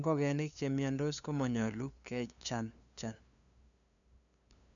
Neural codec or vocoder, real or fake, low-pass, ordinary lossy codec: codec, 16 kHz, 2 kbps, FunCodec, trained on LibriTTS, 25 frames a second; fake; 7.2 kHz; none